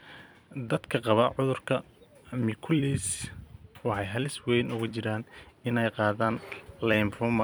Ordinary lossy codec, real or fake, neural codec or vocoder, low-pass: none; fake; vocoder, 44.1 kHz, 128 mel bands every 256 samples, BigVGAN v2; none